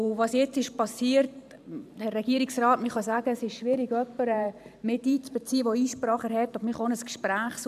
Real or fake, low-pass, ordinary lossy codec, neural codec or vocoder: fake; 14.4 kHz; none; vocoder, 44.1 kHz, 128 mel bands every 512 samples, BigVGAN v2